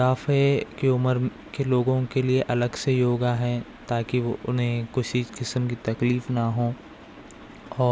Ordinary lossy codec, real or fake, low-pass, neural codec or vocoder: none; real; none; none